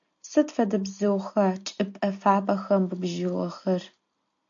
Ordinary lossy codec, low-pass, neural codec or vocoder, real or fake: AAC, 64 kbps; 7.2 kHz; none; real